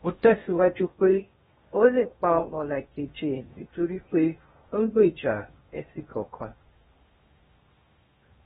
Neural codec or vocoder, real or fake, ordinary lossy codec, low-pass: codec, 16 kHz in and 24 kHz out, 0.6 kbps, FocalCodec, streaming, 4096 codes; fake; AAC, 16 kbps; 10.8 kHz